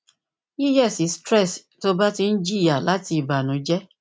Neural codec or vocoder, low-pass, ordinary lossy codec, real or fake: none; none; none; real